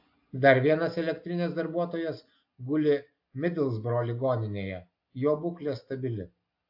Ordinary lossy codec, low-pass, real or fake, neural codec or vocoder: AAC, 48 kbps; 5.4 kHz; real; none